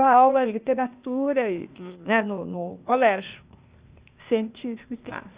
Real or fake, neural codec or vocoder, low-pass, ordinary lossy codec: fake; codec, 16 kHz, 0.8 kbps, ZipCodec; 3.6 kHz; Opus, 64 kbps